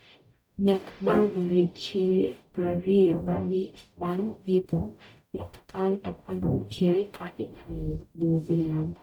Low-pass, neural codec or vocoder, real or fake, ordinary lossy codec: 19.8 kHz; codec, 44.1 kHz, 0.9 kbps, DAC; fake; none